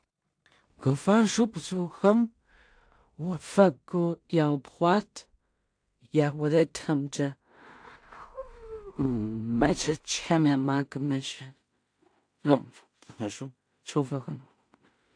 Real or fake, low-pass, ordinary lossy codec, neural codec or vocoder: fake; 9.9 kHz; AAC, 64 kbps; codec, 16 kHz in and 24 kHz out, 0.4 kbps, LongCat-Audio-Codec, two codebook decoder